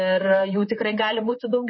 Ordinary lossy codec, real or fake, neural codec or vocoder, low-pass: MP3, 24 kbps; fake; vocoder, 44.1 kHz, 128 mel bands, Pupu-Vocoder; 7.2 kHz